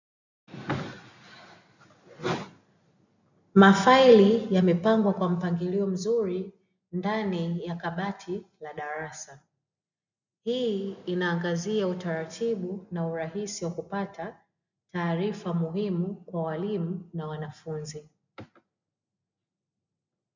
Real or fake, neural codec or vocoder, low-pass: real; none; 7.2 kHz